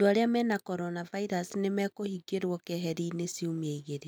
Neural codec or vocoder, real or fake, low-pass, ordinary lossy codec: none; real; 19.8 kHz; none